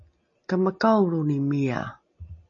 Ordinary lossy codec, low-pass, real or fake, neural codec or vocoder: MP3, 32 kbps; 7.2 kHz; real; none